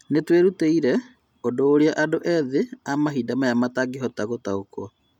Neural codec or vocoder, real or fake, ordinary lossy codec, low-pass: none; real; none; 19.8 kHz